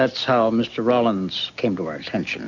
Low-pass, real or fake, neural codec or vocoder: 7.2 kHz; real; none